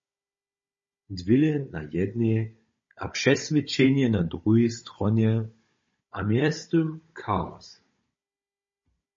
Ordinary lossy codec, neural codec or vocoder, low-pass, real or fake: MP3, 32 kbps; codec, 16 kHz, 16 kbps, FunCodec, trained on Chinese and English, 50 frames a second; 7.2 kHz; fake